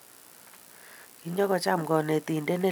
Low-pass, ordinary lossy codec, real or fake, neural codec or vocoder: none; none; real; none